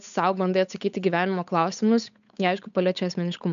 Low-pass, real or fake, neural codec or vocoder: 7.2 kHz; fake; codec, 16 kHz, 8 kbps, FunCodec, trained on Chinese and English, 25 frames a second